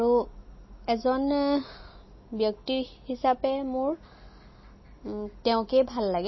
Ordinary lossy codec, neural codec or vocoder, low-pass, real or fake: MP3, 24 kbps; none; 7.2 kHz; real